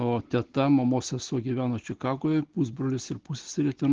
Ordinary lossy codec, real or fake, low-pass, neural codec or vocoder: Opus, 16 kbps; real; 7.2 kHz; none